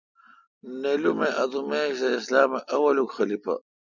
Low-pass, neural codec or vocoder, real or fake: 7.2 kHz; none; real